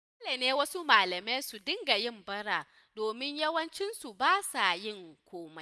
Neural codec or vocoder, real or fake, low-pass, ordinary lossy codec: none; real; none; none